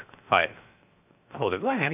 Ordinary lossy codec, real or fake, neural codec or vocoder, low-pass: none; fake; codec, 16 kHz, 0.7 kbps, FocalCodec; 3.6 kHz